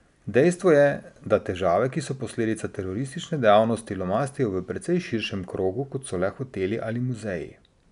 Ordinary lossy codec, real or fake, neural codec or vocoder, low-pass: none; real; none; 10.8 kHz